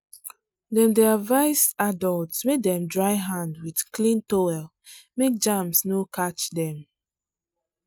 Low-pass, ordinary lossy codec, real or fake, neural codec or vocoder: none; none; real; none